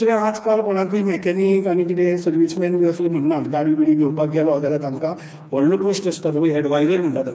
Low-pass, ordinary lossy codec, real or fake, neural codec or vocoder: none; none; fake; codec, 16 kHz, 2 kbps, FreqCodec, smaller model